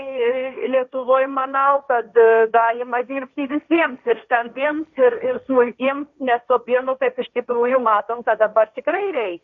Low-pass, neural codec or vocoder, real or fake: 7.2 kHz; codec, 16 kHz, 1.1 kbps, Voila-Tokenizer; fake